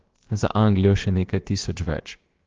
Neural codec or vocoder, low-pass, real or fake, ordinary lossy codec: codec, 16 kHz, about 1 kbps, DyCAST, with the encoder's durations; 7.2 kHz; fake; Opus, 16 kbps